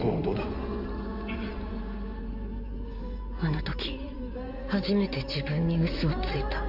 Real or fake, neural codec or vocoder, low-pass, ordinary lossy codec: fake; codec, 16 kHz in and 24 kHz out, 2.2 kbps, FireRedTTS-2 codec; 5.4 kHz; none